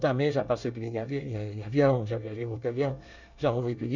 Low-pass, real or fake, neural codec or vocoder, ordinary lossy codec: 7.2 kHz; fake; codec, 24 kHz, 1 kbps, SNAC; none